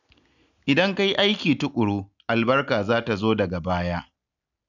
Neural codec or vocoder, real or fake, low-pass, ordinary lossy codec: none; real; 7.2 kHz; none